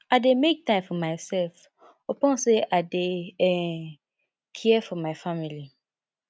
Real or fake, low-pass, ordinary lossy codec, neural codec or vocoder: real; none; none; none